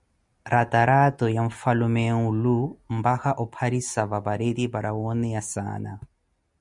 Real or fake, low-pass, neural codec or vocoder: real; 10.8 kHz; none